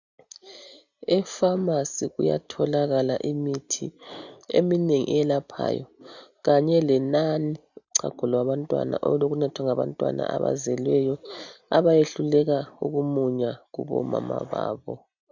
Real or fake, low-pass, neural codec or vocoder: real; 7.2 kHz; none